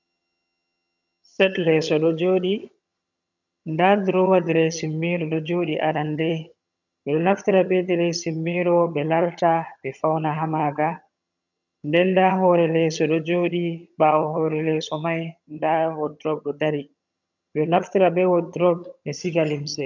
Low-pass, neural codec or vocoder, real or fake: 7.2 kHz; vocoder, 22.05 kHz, 80 mel bands, HiFi-GAN; fake